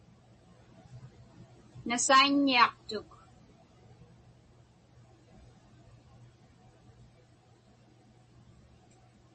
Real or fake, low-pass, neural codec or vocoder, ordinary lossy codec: real; 10.8 kHz; none; MP3, 32 kbps